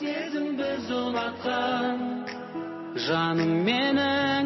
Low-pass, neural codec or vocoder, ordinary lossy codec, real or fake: 7.2 kHz; none; MP3, 24 kbps; real